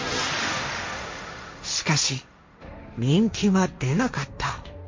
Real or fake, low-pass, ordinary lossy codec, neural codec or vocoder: fake; 7.2 kHz; MP3, 48 kbps; codec, 16 kHz, 1.1 kbps, Voila-Tokenizer